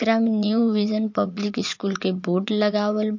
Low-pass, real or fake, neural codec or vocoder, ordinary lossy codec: 7.2 kHz; fake; vocoder, 44.1 kHz, 80 mel bands, Vocos; MP3, 48 kbps